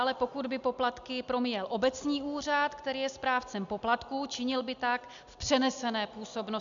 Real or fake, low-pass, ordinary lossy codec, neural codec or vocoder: real; 7.2 kHz; MP3, 96 kbps; none